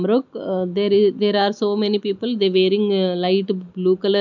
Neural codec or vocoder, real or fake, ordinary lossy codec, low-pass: none; real; none; 7.2 kHz